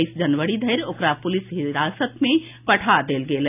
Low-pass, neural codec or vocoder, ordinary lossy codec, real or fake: 3.6 kHz; none; AAC, 32 kbps; real